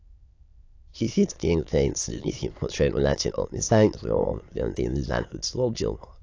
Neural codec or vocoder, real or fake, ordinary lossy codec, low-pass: autoencoder, 22.05 kHz, a latent of 192 numbers a frame, VITS, trained on many speakers; fake; AAC, 48 kbps; 7.2 kHz